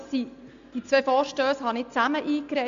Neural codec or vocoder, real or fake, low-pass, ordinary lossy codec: none; real; 7.2 kHz; MP3, 64 kbps